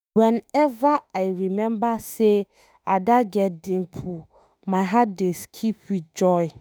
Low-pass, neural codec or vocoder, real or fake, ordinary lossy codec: none; autoencoder, 48 kHz, 32 numbers a frame, DAC-VAE, trained on Japanese speech; fake; none